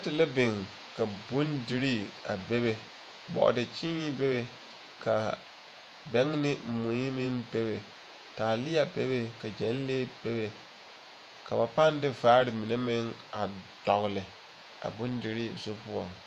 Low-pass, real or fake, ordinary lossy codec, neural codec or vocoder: 14.4 kHz; fake; MP3, 96 kbps; vocoder, 48 kHz, 128 mel bands, Vocos